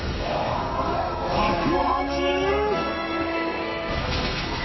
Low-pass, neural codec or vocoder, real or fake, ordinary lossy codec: 7.2 kHz; codec, 32 kHz, 1.9 kbps, SNAC; fake; MP3, 24 kbps